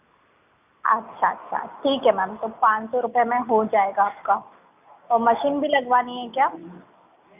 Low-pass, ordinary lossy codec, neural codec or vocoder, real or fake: 3.6 kHz; none; none; real